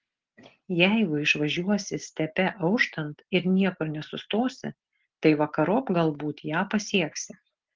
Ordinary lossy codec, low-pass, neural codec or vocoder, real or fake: Opus, 16 kbps; 7.2 kHz; none; real